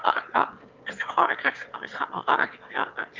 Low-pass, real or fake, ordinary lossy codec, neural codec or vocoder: 7.2 kHz; fake; Opus, 32 kbps; autoencoder, 22.05 kHz, a latent of 192 numbers a frame, VITS, trained on one speaker